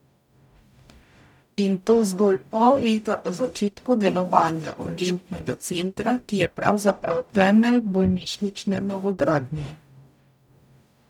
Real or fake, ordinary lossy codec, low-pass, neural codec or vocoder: fake; none; 19.8 kHz; codec, 44.1 kHz, 0.9 kbps, DAC